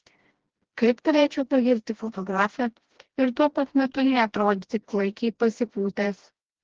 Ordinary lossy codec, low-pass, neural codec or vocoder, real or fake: Opus, 16 kbps; 7.2 kHz; codec, 16 kHz, 1 kbps, FreqCodec, smaller model; fake